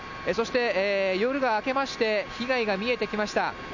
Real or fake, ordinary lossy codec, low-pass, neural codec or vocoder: real; none; 7.2 kHz; none